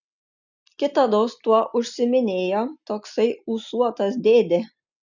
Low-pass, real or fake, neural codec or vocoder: 7.2 kHz; real; none